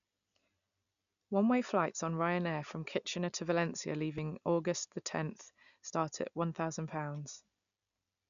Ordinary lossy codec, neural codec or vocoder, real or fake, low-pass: none; none; real; 7.2 kHz